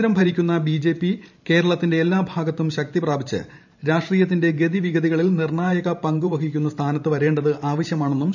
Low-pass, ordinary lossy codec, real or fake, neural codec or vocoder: 7.2 kHz; none; fake; vocoder, 44.1 kHz, 128 mel bands every 512 samples, BigVGAN v2